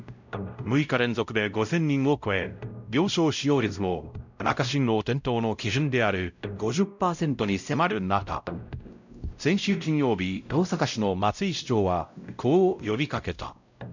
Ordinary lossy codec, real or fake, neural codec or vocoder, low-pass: none; fake; codec, 16 kHz, 0.5 kbps, X-Codec, WavLM features, trained on Multilingual LibriSpeech; 7.2 kHz